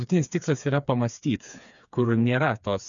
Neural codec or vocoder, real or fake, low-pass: codec, 16 kHz, 4 kbps, FreqCodec, smaller model; fake; 7.2 kHz